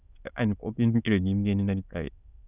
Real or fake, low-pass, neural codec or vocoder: fake; 3.6 kHz; autoencoder, 22.05 kHz, a latent of 192 numbers a frame, VITS, trained on many speakers